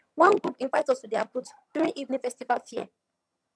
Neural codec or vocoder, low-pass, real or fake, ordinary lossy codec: vocoder, 22.05 kHz, 80 mel bands, HiFi-GAN; none; fake; none